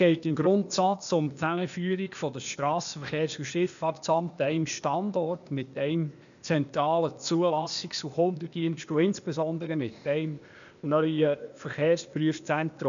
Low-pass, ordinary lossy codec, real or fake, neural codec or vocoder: 7.2 kHz; none; fake; codec, 16 kHz, 0.8 kbps, ZipCodec